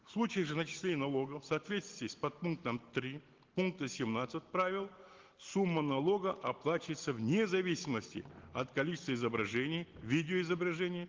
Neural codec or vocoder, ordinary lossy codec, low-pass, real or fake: none; Opus, 16 kbps; 7.2 kHz; real